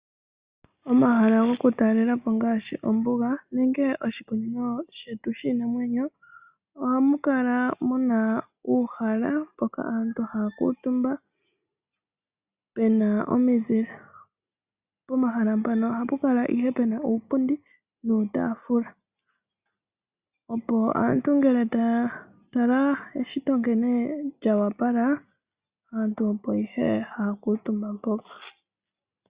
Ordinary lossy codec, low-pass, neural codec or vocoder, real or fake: Opus, 64 kbps; 3.6 kHz; none; real